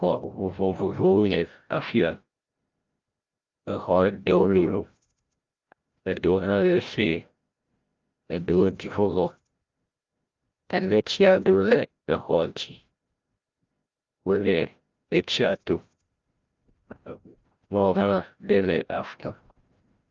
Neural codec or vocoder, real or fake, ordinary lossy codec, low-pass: codec, 16 kHz, 0.5 kbps, FreqCodec, larger model; fake; Opus, 32 kbps; 7.2 kHz